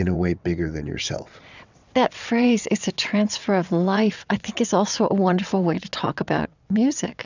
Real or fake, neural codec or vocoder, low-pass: fake; vocoder, 22.05 kHz, 80 mel bands, WaveNeXt; 7.2 kHz